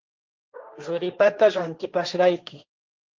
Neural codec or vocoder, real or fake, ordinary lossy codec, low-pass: codec, 16 kHz, 1.1 kbps, Voila-Tokenizer; fake; Opus, 24 kbps; 7.2 kHz